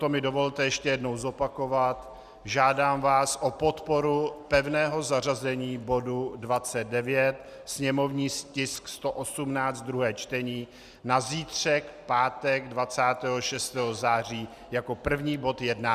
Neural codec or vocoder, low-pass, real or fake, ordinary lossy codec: none; 14.4 kHz; real; Opus, 64 kbps